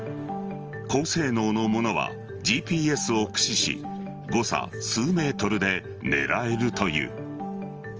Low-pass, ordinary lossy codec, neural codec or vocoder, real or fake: 7.2 kHz; Opus, 24 kbps; none; real